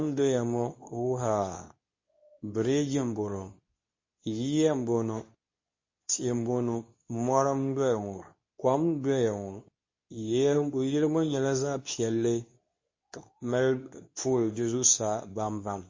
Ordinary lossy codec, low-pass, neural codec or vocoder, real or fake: MP3, 32 kbps; 7.2 kHz; codec, 24 kHz, 0.9 kbps, WavTokenizer, medium speech release version 2; fake